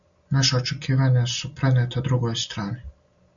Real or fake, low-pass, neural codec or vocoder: real; 7.2 kHz; none